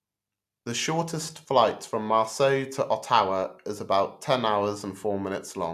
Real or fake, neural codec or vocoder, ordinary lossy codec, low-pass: real; none; AAC, 96 kbps; 14.4 kHz